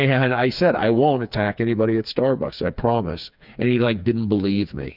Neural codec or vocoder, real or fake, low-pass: codec, 16 kHz, 4 kbps, FreqCodec, smaller model; fake; 5.4 kHz